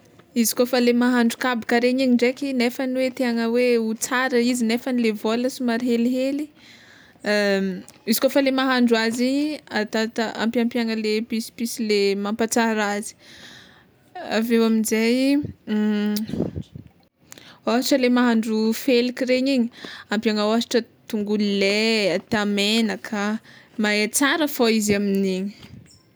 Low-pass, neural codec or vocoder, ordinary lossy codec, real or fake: none; none; none; real